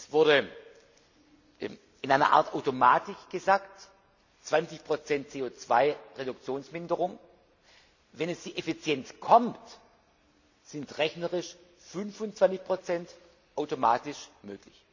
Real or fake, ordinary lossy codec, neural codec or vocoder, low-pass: real; none; none; 7.2 kHz